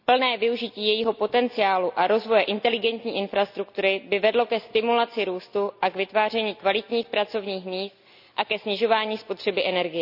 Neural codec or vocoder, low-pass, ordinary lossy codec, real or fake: none; 5.4 kHz; none; real